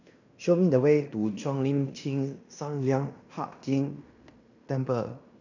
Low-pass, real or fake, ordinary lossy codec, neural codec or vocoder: 7.2 kHz; fake; none; codec, 16 kHz in and 24 kHz out, 0.9 kbps, LongCat-Audio-Codec, fine tuned four codebook decoder